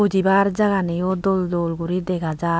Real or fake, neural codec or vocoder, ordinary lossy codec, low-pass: real; none; none; none